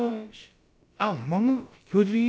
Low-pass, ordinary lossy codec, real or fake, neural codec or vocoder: none; none; fake; codec, 16 kHz, about 1 kbps, DyCAST, with the encoder's durations